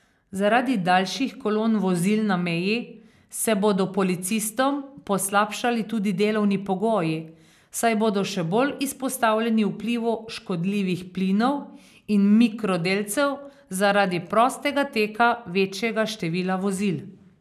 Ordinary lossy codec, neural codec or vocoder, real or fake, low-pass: none; none; real; 14.4 kHz